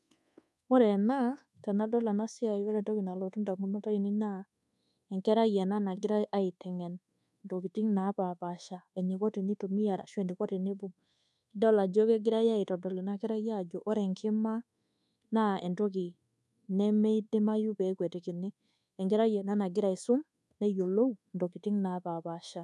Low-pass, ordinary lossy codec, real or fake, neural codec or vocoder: none; none; fake; codec, 24 kHz, 1.2 kbps, DualCodec